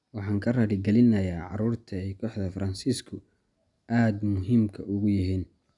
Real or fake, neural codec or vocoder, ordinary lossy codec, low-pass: real; none; none; 10.8 kHz